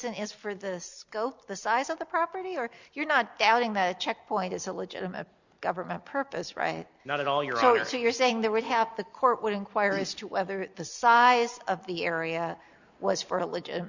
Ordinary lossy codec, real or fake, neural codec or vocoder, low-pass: Opus, 64 kbps; real; none; 7.2 kHz